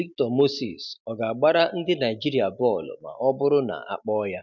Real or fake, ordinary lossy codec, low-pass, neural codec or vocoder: real; none; 7.2 kHz; none